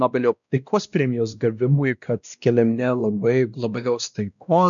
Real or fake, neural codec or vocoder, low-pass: fake; codec, 16 kHz, 1 kbps, X-Codec, WavLM features, trained on Multilingual LibriSpeech; 7.2 kHz